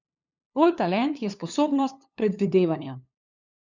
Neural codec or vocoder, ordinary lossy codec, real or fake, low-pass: codec, 16 kHz, 2 kbps, FunCodec, trained on LibriTTS, 25 frames a second; none; fake; 7.2 kHz